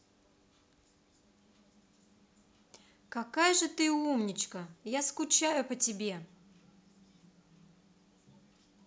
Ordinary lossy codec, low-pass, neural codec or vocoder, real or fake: none; none; none; real